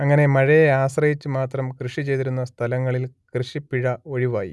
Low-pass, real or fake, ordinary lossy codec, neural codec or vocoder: none; real; none; none